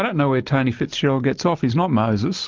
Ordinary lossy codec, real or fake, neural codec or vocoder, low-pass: Opus, 32 kbps; real; none; 7.2 kHz